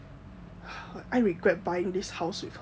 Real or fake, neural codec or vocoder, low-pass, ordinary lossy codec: real; none; none; none